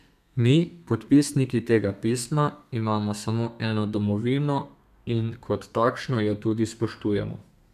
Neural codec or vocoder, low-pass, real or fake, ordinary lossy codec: codec, 32 kHz, 1.9 kbps, SNAC; 14.4 kHz; fake; none